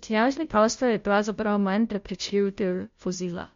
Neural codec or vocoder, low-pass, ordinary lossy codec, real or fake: codec, 16 kHz, 0.5 kbps, FunCodec, trained on Chinese and English, 25 frames a second; 7.2 kHz; MP3, 48 kbps; fake